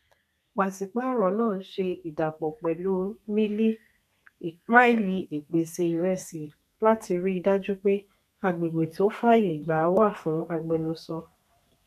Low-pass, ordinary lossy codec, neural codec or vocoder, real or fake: 14.4 kHz; none; codec, 32 kHz, 1.9 kbps, SNAC; fake